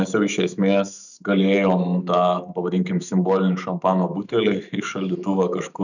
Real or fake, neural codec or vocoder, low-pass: fake; autoencoder, 48 kHz, 128 numbers a frame, DAC-VAE, trained on Japanese speech; 7.2 kHz